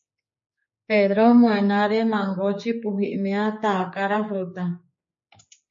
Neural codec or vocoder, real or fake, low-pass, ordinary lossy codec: codec, 16 kHz, 4 kbps, X-Codec, HuBERT features, trained on general audio; fake; 7.2 kHz; MP3, 32 kbps